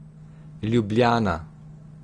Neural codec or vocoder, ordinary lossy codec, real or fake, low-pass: none; Opus, 24 kbps; real; 9.9 kHz